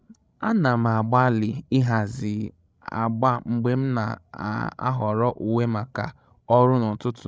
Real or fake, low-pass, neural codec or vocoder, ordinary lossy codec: fake; none; codec, 16 kHz, 16 kbps, FreqCodec, larger model; none